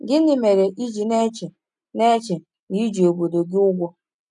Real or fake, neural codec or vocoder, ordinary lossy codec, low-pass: real; none; none; 10.8 kHz